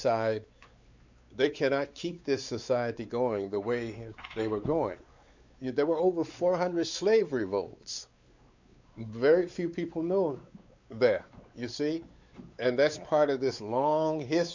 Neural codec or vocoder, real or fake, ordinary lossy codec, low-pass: codec, 16 kHz, 4 kbps, X-Codec, WavLM features, trained on Multilingual LibriSpeech; fake; Opus, 64 kbps; 7.2 kHz